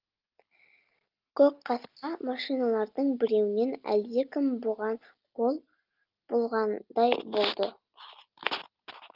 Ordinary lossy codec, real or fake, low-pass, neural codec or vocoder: Opus, 32 kbps; real; 5.4 kHz; none